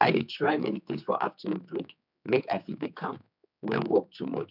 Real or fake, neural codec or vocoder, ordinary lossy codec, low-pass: fake; codec, 16 kHz, 4 kbps, X-Codec, HuBERT features, trained on general audio; none; 5.4 kHz